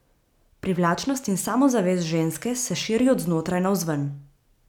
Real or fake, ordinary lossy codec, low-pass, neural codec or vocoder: real; none; 19.8 kHz; none